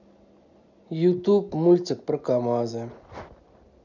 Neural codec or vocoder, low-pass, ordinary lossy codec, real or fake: none; 7.2 kHz; none; real